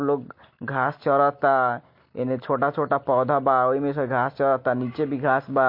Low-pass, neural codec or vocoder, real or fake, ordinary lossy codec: 5.4 kHz; none; real; MP3, 32 kbps